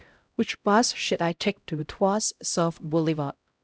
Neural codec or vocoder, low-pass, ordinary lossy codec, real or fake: codec, 16 kHz, 0.5 kbps, X-Codec, HuBERT features, trained on LibriSpeech; none; none; fake